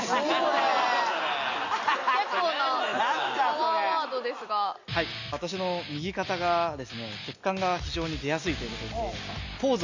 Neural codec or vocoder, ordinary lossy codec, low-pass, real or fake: none; Opus, 64 kbps; 7.2 kHz; real